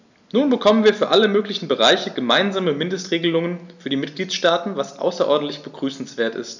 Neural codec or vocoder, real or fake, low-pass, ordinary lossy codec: none; real; 7.2 kHz; none